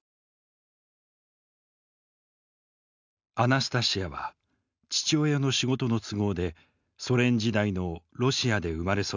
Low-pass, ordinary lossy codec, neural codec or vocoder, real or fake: 7.2 kHz; none; none; real